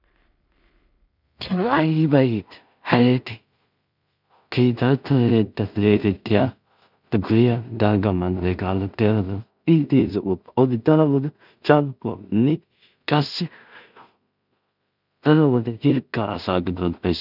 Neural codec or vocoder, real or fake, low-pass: codec, 16 kHz in and 24 kHz out, 0.4 kbps, LongCat-Audio-Codec, two codebook decoder; fake; 5.4 kHz